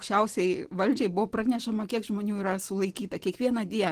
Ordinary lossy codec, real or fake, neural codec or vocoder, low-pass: Opus, 16 kbps; real; none; 14.4 kHz